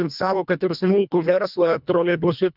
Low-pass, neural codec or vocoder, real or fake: 5.4 kHz; codec, 24 kHz, 1.5 kbps, HILCodec; fake